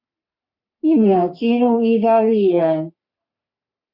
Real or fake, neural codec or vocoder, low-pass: fake; codec, 44.1 kHz, 3.4 kbps, Pupu-Codec; 5.4 kHz